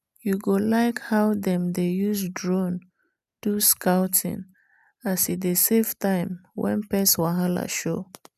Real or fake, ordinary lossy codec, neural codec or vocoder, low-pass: real; none; none; 14.4 kHz